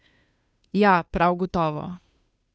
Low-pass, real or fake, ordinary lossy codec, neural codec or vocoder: none; fake; none; codec, 16 kHz, 2 kbps, FunCodec, trained on Chinese and English, 25 frames a second